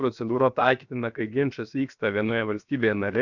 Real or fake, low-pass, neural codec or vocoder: fake; 7.2 kHz; codec, 16 kHz, 0.7 kbps, FocalCodec